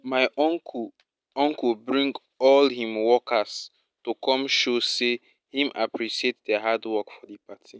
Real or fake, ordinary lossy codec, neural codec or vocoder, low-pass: real; none; none; none